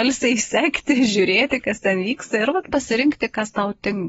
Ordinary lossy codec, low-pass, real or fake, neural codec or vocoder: AAC, 24 kbps; 19.8 kHz; real; none